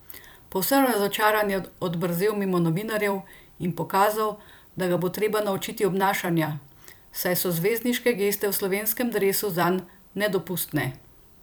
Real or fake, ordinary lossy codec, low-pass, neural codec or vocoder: fake; none; none; vocoder, 44.1 kHz, 128 mel bands every 256 samples, BigVGAN v2